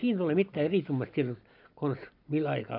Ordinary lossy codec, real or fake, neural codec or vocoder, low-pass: none; real; none; 5.4 kHz